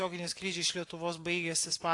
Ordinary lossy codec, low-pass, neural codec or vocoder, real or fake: AAC, 48 kbps; 10.8 kHz; none; real